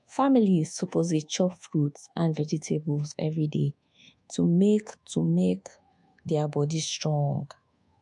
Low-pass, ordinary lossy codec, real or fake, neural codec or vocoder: 10.8 kHz; MP3, 64 kbps; fake; codec, 24 kHz, 1.2 kbps, DualCodec